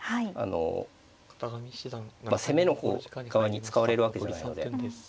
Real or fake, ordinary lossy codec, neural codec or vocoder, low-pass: real; none; none; none